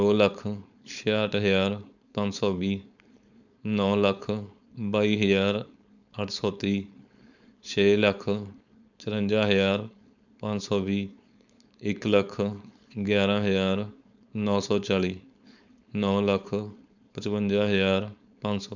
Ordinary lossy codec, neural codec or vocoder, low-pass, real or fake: none; codec, 16 kHz, 4.8 kbps, FACodec; 7.2 kHz; fake